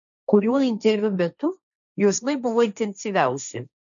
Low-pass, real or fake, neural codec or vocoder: 7.2 kHz; fake; codec, 16 kHz, 1.1 kbps, Voila-Tokenizer